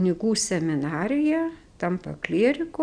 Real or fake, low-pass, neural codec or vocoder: real; 9.9 kHz; none